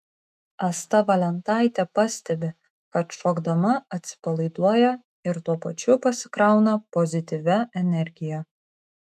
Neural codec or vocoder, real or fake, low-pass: autoencoder, 48 kHz, 128 numbers a frame, DAC-VAE, trained on Japanese speech; fake; 14.4 kHz